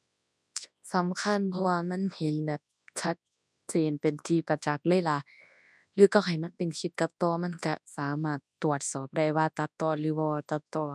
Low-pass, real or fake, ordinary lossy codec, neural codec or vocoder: none; fake; none; codec, 24 kHz, 0.9 kbps, WavTokenizer, large speech release